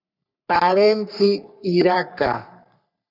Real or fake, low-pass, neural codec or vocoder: fake; 5.4 kHz; codec, 44.1 kHz, 3.4 kbps, Pupu-Codec